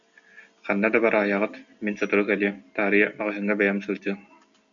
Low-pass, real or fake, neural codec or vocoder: 7.2 kHz; real; none